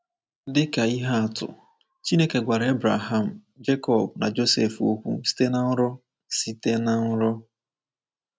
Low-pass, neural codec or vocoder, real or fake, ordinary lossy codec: none; none; real; none